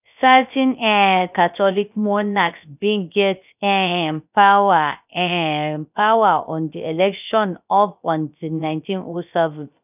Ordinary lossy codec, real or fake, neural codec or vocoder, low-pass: none; fake; codec, 16 kHz, 0.3 kbps, FocalCodec; 3.6 kHz